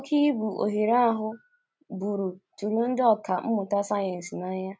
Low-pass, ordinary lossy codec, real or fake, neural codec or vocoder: none; none; real; none